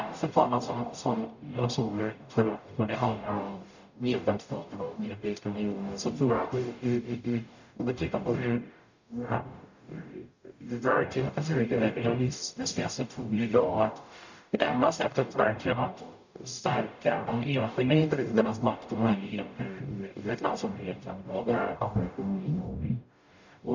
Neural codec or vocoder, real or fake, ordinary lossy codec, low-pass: codec, 44.1 kHz, 0.9 kbps, DAC; fake; none; 7.2 kHz